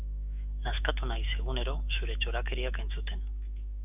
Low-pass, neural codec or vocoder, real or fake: 3.6 kHz; autoencoder, 48 kHz, 128 numbers a frame, DAC-VAE, trained on Japanese speech; fake